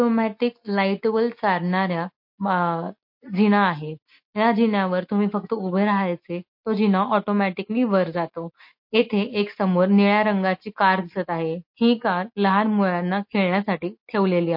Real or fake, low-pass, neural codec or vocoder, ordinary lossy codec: real; 5.4 kHz; none; MP3, 32 kbps